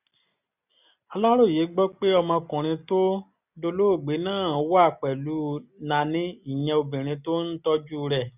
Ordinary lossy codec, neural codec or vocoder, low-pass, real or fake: none; none; 3.6 kHz; real